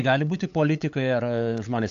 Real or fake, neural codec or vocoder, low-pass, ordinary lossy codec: fake; codec, 16 kHz, 8 kbps, FunCodec, trained on LibriTTS, 25 frames a second; 7.2 kHz; AAC, 64 kbps